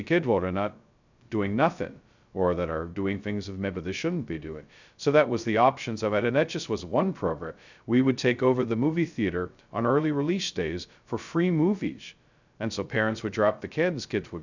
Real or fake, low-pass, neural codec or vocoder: fake; 7.2 kHz; codec, 16 kHz, 0.2 kbps, FocalCodec